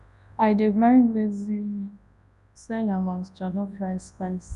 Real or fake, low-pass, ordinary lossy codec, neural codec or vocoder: fake; 10.8 kHz; none; codec, 24 kHz, 0.9 kbps, WavTokenizer, large speech release